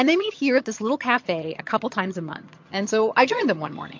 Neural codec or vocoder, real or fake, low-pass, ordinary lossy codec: vocoder, 22.05 kHz, 80 mel bands, HiFi-GAN; fake; 7.2 kHz; MP3, 48 kbps